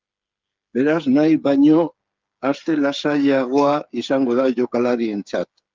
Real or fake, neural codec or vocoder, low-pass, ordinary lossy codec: fake; codec, 16 kHz, 8 kbps, FreqCodec, smaller model; 7.2 kHz; Opus, 24 kbps